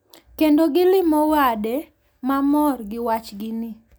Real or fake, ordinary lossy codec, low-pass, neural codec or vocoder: real; none; none; none